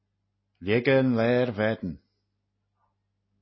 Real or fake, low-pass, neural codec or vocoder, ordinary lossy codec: real; 7.2 kHz; none; MP3, 24 kbps